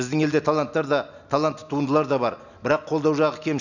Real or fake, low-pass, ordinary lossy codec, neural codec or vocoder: real; 7.2 kHz; none; none